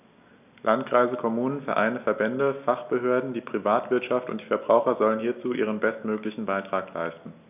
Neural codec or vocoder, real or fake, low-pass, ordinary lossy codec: none; real; 3.6 kHz; none